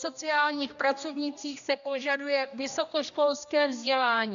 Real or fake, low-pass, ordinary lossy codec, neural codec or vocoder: fake; 7.2 kHz; MP3, 96 kbps; codec, 16 kHz, 1 kbps, X-Codec, HuBERT features, trained on general audio